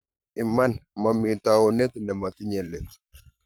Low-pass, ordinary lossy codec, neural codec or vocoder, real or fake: none; none; codec, 44.1 kHz, 7.8 kbps, Pupu-Codec; fake